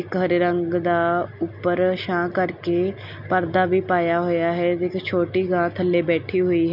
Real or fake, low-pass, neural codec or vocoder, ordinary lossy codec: real; 5.4 kHz; none; none